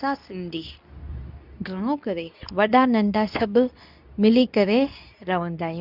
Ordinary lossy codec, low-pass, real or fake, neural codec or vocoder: none; 5.4 kHz; fake; codec, 24 kHz, 0.9 kbps, WavTokenizer, medium speech release version 2